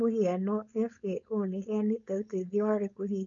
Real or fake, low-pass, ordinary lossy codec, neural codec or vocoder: fake; 7.2 kHz; none; codec, 16 kHz, 4.8 kbps, FACodec